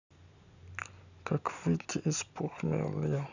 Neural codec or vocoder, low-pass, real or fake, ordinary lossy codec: none; 7.2 kHz; real; none